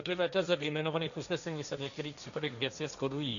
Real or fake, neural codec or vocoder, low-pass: fake; codec, 16 kHz, 1.1 kbps, Voila-Tokenizer; 7.2 kHz